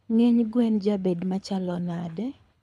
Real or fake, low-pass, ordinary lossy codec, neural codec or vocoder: fake; none; none; codec, 24 kHz, 6 kbps, HILCodec